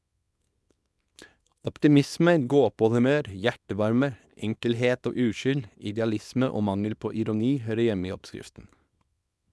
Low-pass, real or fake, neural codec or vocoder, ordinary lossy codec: none; fake; codec, 24 kHz, 0.9 kbps, WavTokenizer, small release; none